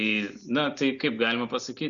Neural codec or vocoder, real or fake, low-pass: none; real; 7.2 kHz